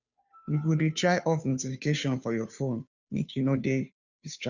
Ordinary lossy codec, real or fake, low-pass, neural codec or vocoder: none; fake; 7.2 kHz; codec, 16 kHz, 2 kbps, FunCodec, trained on Chinese and English, 25 frames a second